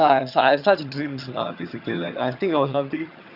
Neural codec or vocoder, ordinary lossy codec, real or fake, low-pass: vocoder, 22.05 kHz, 80 mel bands, HiFi-GAN; none; fake; 5.4 kHz